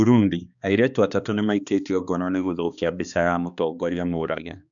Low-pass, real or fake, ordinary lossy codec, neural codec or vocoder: 7.2 kHz; fake; none; codec, 16 kHz, 2 kbps, X-Codec, HuBERT features, trained on balanced general audio